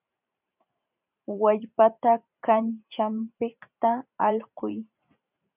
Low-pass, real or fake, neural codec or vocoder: 3.6 kHz; fake; vocoder, 44.1 kHz, 128 mel bands every 512 samples, BigVGAN v2